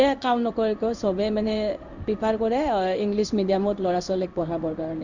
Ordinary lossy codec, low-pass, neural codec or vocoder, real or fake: none; 7.2 kHz; codec, 16 kHz in and 24 kHz out, 1 kbps, XY-Tokenizer; fake